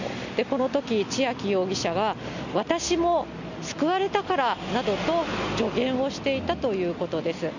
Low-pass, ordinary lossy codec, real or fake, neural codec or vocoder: 7.2 kHz; none; real; none